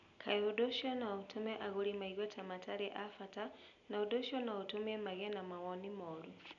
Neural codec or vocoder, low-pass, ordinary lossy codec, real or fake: none; 7.2 kHz; none; real